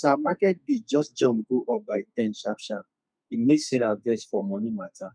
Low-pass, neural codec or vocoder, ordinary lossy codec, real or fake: 9.9 kHz; codec, 32 kHz, 1.9 kbps, SNAC; none; fake